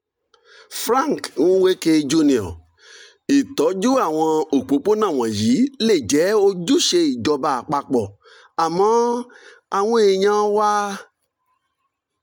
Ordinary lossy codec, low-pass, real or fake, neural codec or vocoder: none; 19.8 kHz; real; none